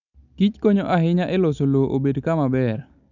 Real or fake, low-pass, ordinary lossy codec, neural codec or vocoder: real; 7.2 kHz; none; none